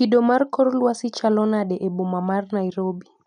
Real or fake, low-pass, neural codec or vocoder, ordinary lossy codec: real; 9.9 kHz; none; none